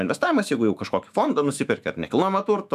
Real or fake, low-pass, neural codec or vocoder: fake; 14.4 kHz; autoencoder, 48 kHz, 128 numbers a frame, DAC-VAE, trained on Japanese speech